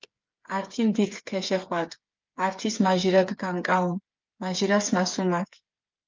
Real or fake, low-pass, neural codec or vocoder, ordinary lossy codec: fake; 7.2 kHz; codec, 16 kHz, 8 kbps, FreqCodec, smaller model; Opus, 24 kbps